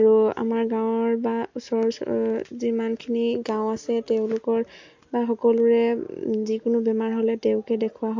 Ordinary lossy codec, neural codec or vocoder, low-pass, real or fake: MP3, 48 kbps; none; 7.2 kHz; real